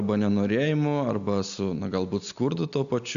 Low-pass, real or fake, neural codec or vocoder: 7.2 kHz; real; none